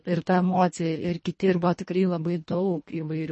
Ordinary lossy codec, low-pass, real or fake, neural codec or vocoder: MP3, 32 kbps; 10.8 kHz; fake; codec, 24 kHz, 1.5 kbps, HILCodec